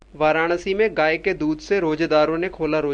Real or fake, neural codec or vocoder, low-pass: real; none; 9.9 kHz